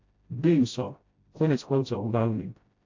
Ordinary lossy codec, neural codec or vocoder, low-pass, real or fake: AAC, 48 kbps; codec, 16 kHz, 0.5 kbps, FreqCodec, smaller model; 7.2 kHz; fake